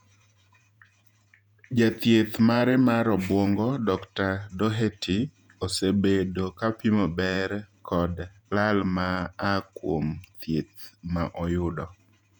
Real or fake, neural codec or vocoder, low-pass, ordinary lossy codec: fake; vocoder, 48 kHz, 128 mel bands, Vocos; 19.8 kHz; none